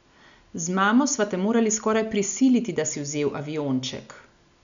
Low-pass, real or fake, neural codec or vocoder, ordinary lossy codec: 7.2 kHz; real; none; none